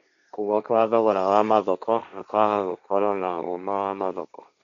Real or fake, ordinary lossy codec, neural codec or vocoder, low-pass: fake; none; codec, 16 kHz, 1.1 kbps, Voila-Tokenizer; 7.2 kHz